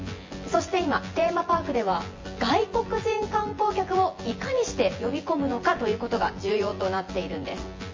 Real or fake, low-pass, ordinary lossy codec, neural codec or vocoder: fake; 7.2 kHz; MP3, 32 kbps; vocoder, 24 kHz, 100 mel bands, Vocos